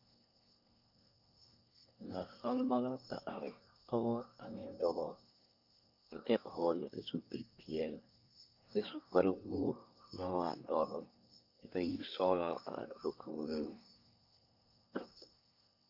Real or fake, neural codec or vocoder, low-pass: fake; codec, 24 kHz, 1 kbps, SNAC; 5.4 kHz